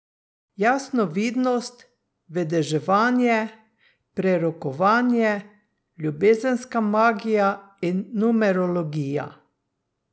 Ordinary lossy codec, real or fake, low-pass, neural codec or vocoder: none; real; none; none